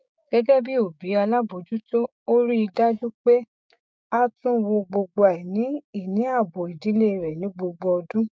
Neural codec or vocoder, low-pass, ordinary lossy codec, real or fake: codec, 16 kHz, 6 kbps, DAC; none; none; fake